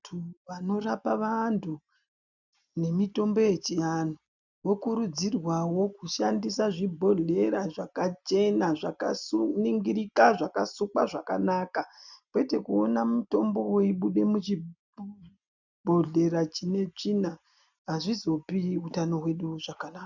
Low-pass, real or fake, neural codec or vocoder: 7.2 kHz; real; none